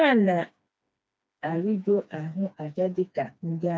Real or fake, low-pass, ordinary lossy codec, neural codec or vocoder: fake; none; none; codec, 16 kHz, 2 kbps, FreqCodec, smaller model